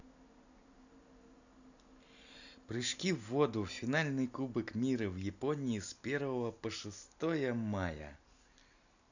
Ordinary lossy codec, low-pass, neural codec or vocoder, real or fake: none; 7.2 kHz; none; real